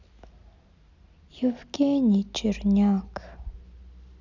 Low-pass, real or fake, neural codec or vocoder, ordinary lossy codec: 7.2 kHz; real; none; none